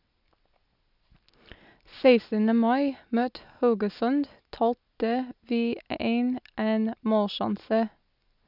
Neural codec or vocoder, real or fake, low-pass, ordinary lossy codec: none; real; 5.4 kHz; none